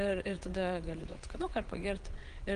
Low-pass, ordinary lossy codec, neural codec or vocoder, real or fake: 9.9 kHz; Opus, 24 kbps; vocoder, 22.05 kHz, 80 mel bands, WaveNeXt; fake